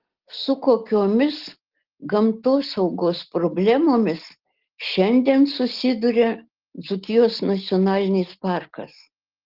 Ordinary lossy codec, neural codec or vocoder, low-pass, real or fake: Opus, 16 kbps; none; 5.4 kHz; real